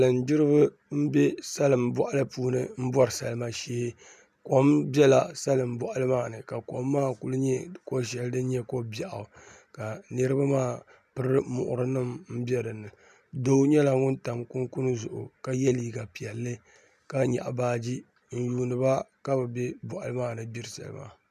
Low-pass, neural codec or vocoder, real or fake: 14.4 kHz; none; real